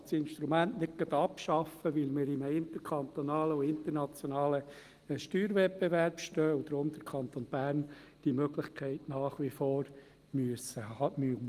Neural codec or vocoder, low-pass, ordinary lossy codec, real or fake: none; 14.4 kHz; Opus, 24 kbps; real